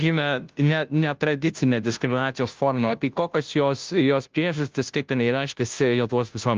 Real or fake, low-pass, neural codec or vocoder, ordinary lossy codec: fake; 7.2 kHz; codec, 16 kHz, 0.5 kbps, FunCodec, trained on Chinese and English, 25 frames a second; Opus, 24 kbps